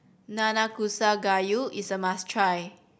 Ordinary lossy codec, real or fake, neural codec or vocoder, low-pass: none; real; none; none